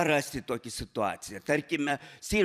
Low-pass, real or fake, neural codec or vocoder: 14.4 kHz; fake; vocoder, 44.1 kHz, 128 mel bands every 256 samples, BigVGAN v2